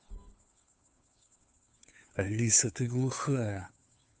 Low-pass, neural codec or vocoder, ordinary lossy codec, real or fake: none; codec, 16 kHz, 2 kbps, FunCodec, trained on Chinese and English, 25 frames a second; none; fake